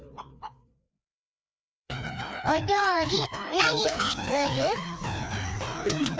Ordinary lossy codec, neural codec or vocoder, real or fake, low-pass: none; codec, 16 kHz, 2 kbps, FreqCodec, larger model; fake; none